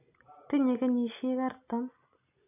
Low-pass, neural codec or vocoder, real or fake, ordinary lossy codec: 3.6 kHz; none; real; AAC, 32 kbps